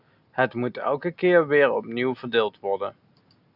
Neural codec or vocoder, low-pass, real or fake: none; 5.4 kHz; real